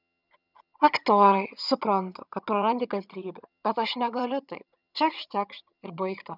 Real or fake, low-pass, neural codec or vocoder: fake; 5.4 kHz; vocoder, 22.05 kHz, 80 mel bands, HiFi-GAN